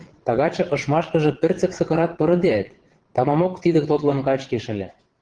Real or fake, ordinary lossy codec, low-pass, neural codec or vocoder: fake; Opus, 16 kbps; 9.9 kHz; vocoder, 22.05 kHz, 80 mel bands, WaveNeXt